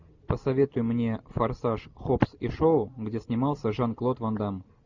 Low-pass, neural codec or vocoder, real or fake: 7.2 kHz; none; real